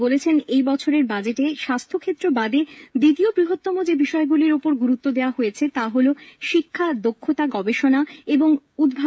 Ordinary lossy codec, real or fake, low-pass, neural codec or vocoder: none; fake; none; codec, 16 kHz, 16 kbps, FreqCodec, smaller model